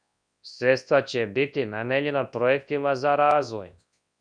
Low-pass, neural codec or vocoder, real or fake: 9.9 kHz; codec, 24 kHz, 0.9 kbps, WavTokenizer, large speech release; fake